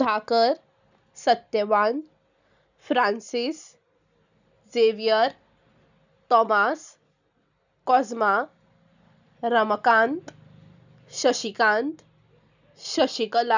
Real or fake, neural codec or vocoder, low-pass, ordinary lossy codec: real; none; 7.2 kHz; none